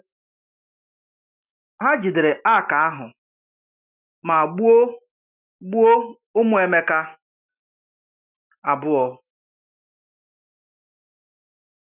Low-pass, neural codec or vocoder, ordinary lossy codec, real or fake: 3.6 kHz; none; none; real